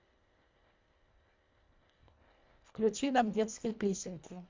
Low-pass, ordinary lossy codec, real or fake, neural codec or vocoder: 7.2 kHz; MP3, 64 kbps; fake; codec, 24 kHz, 1.5 kbps, HILCodec